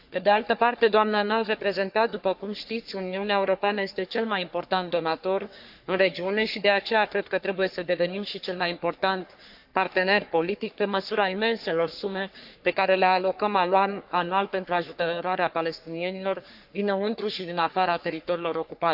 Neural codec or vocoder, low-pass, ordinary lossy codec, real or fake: codec, 44.1 kHz, 3.4 kbps, Pupu-Codec; 5.4 kHz; AAC, 48 kbps; fake